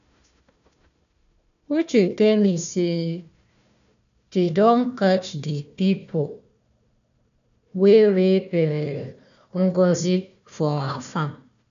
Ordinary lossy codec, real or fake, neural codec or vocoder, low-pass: none; fake; codec, 16 kHz, 1 kbps, FunCodec, trained on Chinese and English, 50 frames a second; 7.2 kHz